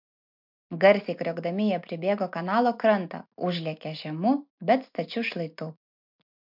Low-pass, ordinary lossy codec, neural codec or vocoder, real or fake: 5.4 kHz; MP3, 48 kbps; none; real